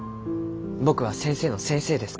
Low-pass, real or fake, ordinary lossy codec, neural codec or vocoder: none; real; none; none